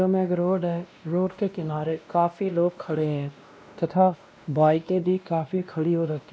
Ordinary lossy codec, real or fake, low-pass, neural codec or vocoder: none; fake; none; codec, 16 kHz, 1 kbps, X-Codec, WavLM features, trained on Multilingual LibriSpeech